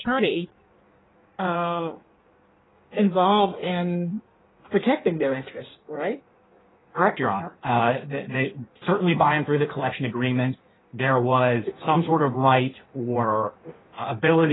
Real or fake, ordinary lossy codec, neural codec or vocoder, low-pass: fake; AAC, 16 kbps; codec, 16 kHz in and 24 kHz out, 1.1 kbps, FireRedTTS-2 codec; 7.2 kHz